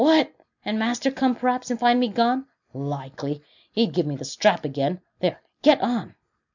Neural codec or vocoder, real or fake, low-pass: none; real; 7.2 kHz